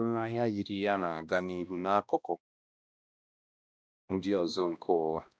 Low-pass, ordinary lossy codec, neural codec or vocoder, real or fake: none; none; codec, 16 kHz, 1 kbps, X-Codec, HuBERT features, trained on balanced general audio; fake